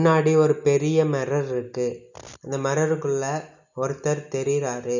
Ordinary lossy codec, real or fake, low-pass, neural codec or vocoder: none; real; 7.2 kHz; none